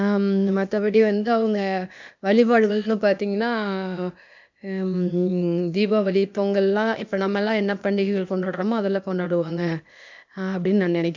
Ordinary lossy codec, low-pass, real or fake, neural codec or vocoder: MP3, 64 kbps; 7.2 kHz; fake; codec, 16 kHz, 0.8 kbps, ZipCodec